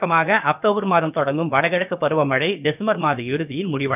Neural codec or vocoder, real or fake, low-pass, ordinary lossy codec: codec, 16 kHz, about 1 kbps, DyCAST, with the encoder's durations; fake; 3.6 kHz; none